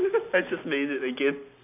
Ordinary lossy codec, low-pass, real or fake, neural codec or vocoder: none; 3.6 kHz; real; none